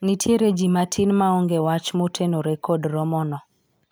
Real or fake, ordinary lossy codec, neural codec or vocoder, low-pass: real; none; none; none